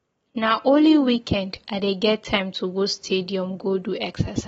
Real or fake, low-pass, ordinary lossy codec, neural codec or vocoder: real; 9.9 kHz; AAC, 24 kbps; none